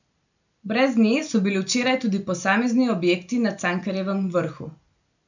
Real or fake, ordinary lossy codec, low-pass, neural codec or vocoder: real; none; 7.2 kHz; none